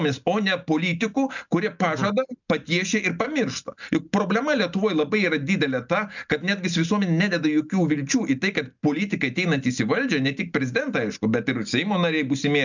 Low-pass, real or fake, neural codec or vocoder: 7.2 kHz; real; none